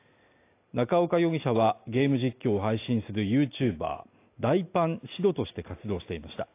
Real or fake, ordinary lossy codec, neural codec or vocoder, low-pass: real; AAC, 24 kbps; none; 3.6 kHz